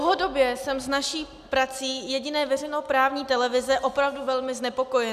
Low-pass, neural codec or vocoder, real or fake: 14.4 kHz; none; real